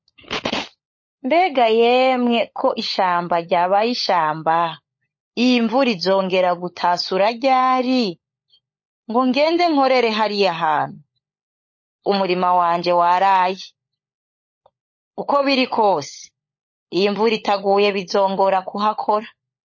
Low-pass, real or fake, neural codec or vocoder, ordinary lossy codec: 7.2 kHz; fake; codec, 16 kHz, 16 kbps, FunCodec, trained on LibriTTS, 50 frames a second; MP3, 32 kbps